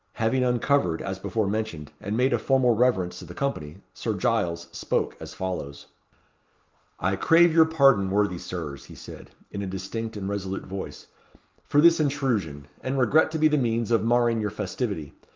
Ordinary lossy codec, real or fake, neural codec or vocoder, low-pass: Opus, 24 kbps; real; none; 7.2 kHz